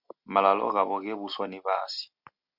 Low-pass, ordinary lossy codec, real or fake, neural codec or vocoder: 5.4 kHz; Opus, 64 kbps; real; none